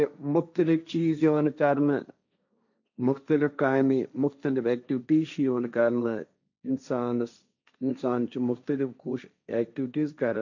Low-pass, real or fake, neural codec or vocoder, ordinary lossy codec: 7.2 kHz; fake; codec, 16 kHz, 1.1 kbps, Voila-Tokenizer; none